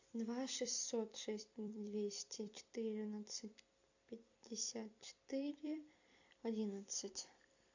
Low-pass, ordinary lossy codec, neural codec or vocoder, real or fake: 7.2 kHz; AAC, 48 kbps; vocoder, 44.1 kHz, 128 mel bands every 256 samples, BigVGAN v2; fake